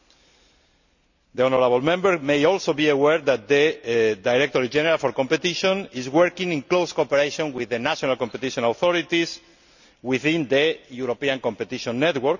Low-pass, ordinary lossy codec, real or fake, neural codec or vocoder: 7.2 kHz; none; real; none